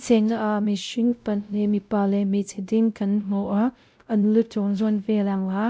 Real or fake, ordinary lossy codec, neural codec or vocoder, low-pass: fake; none; codec, 16 kHz, 0.5 kbps, X-Codec, WavLM features, trained on Multilingual LibriSpeech; none